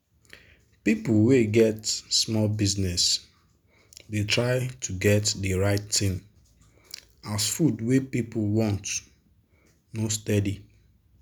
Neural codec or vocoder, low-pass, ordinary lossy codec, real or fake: none; none; none; real